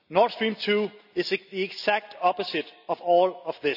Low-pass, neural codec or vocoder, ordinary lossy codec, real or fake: 5.4 kHz; none; none; real